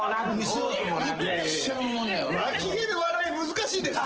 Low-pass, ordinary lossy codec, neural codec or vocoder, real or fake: 7.2 kHz; Opus, 16 kbps; none; real